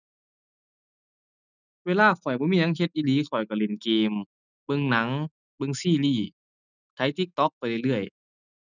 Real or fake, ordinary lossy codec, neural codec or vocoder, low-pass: real; none; none; 7.2 kHz